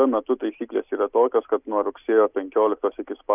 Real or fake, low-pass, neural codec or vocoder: real; 3.6 kHz; none